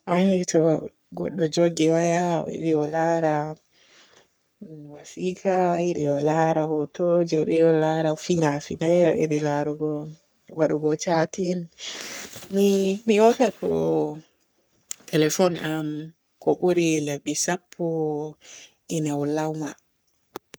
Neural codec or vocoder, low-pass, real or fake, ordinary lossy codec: codec, 44.1 kHz, 3.4 kbps, Pupu-Codec; none; fake; none